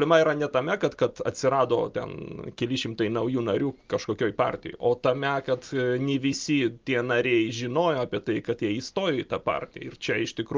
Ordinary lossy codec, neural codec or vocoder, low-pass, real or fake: Opus, 24 kbps; none; 7.2 kHz; real